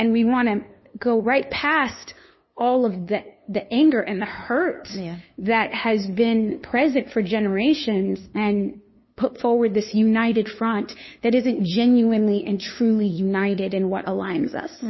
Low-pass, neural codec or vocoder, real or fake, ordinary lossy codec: 7.2 kHz; codec, 16 kHz, 2 kbps, FunCodec, trained on LibriTTS, 25 frames a second; fake; MP3, 24 kbps